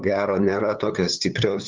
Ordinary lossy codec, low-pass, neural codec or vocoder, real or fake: Opus, 32 kbps; 7.2 kHz; codec, 16 kHz, 8 kbps, FunCodec, trained on LibriTTS, 25 frames a second; fake